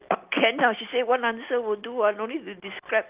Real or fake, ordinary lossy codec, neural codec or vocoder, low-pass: real; Opus, 24 kbps; none; 3.6 kHz